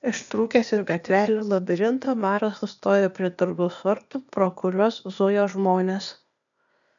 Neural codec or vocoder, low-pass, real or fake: codec, 16 kHz, 0.8 kbps, ZipCodec; 7.2 kHz; fake